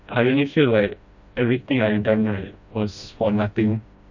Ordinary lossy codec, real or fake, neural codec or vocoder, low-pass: none; fake; codec, 16 kHz, 1 kbps, FreqCodec, smaller model; 7.2 kHz